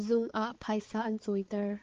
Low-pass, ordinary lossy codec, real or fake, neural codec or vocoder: 7.2 kHz; Opus, 16 kbps; fake; codec, 16 kHz, 4 kbps, X-Codec, HuBERT features, trained on balanced general audio